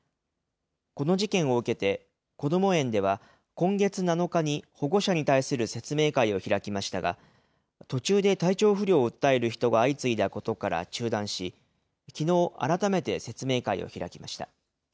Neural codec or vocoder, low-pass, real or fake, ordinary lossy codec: none; none; real; none